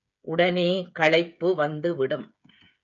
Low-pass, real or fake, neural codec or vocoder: 7.2 kHz; fake; codec, 16 kHz, 8 kbps, FreqCodec, smaller model